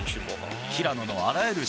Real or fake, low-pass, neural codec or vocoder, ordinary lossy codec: real; none; none; none